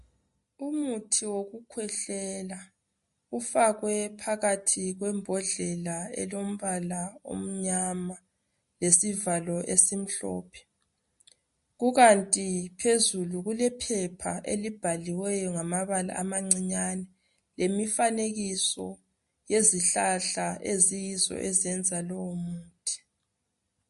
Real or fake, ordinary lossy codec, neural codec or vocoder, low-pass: real; MP3, 48 kbps; none; 14.4 kHz